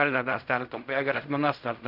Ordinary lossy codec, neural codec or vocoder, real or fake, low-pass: none; codec, 16 kHz in and 24 kHz out, 0.4 kbps, LongCat-Audio-Codec, fine tuned four codebook decoder; fake; 5.4 kHz